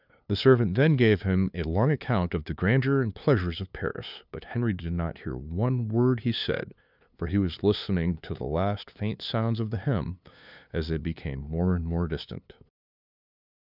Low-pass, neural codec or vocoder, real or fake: 5.4 kHz; codec, 16 kHz, 2 kbps, FunCodec, trained on LibriTTS, 25 frames a second; fake